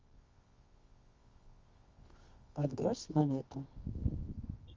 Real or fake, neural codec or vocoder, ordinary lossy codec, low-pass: fake; codec, 24 kHz, 0.9 kbps, WavTokenizer, medium music audio release; Opus, 32 kbps; 7.2 kHz